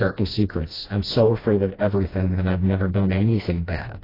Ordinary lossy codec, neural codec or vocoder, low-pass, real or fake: AAC, 24 kbps; codec, 16 kHz, 1 kbps, FreqCodec, smaller model; 5.4 kHz; fake